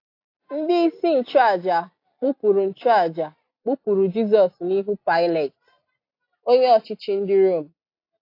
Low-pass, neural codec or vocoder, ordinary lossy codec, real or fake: 5.4 kHz; none; AAC, 32 kbps; real